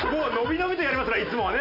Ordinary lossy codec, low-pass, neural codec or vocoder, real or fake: none; 5.4 kHz; none; real